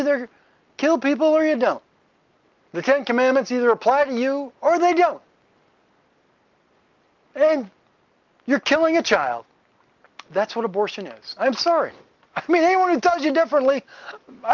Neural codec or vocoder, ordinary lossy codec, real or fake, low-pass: none; Opus, 24 kbps; real; 7.2 kHz